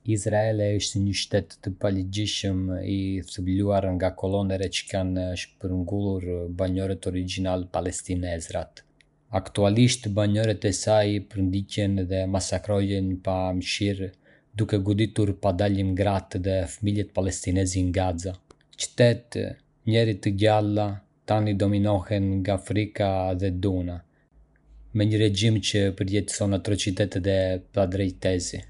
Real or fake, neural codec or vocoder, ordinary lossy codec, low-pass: real; none; none; 10.8 kHz